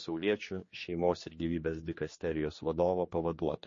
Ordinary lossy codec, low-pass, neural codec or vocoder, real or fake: MP3, 32 kbps; 7.2 kHz; codec, 16 kHz, 2 kbps, X-Codec, HuBERT features, trained on general audio; fake